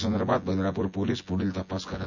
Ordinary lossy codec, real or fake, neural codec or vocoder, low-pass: none; fake; vocoder, 24 kHz, 100 mel bands, Vocos; 7.2 kHz